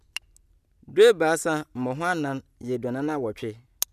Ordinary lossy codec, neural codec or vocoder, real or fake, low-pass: none; none; real; 14.4 kHz